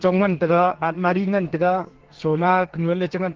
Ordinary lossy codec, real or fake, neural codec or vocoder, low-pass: Opus, 16 kbps; fake; codec, 32 kHz, 1.9 kbps, SNAC; 7.2 kHz